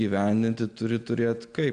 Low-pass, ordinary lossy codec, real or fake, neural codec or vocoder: 9.9 kHz; Opus, 64 kbps; real; none